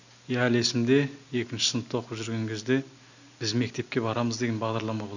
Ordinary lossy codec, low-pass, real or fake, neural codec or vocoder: none; 7.2 kHz; real; none